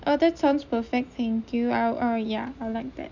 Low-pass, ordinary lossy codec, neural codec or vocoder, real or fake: 7.2 kHz; none; none; real